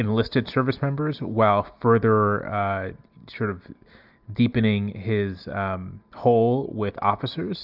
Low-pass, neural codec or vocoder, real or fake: 5.4 kHz; none; real